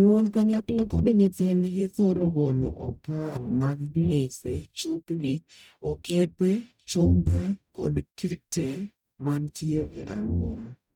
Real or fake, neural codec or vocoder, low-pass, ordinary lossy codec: fake; codec, 44.1 kHz, 0.9 kbps, DAC; 19.8 kHz; none